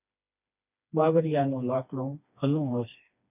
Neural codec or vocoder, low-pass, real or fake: codec, 16 kHz, 2 kbps, FreqCodec, smaller model; 3.6 kHz; fake